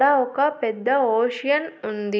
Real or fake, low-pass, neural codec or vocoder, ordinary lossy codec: real; none; none; none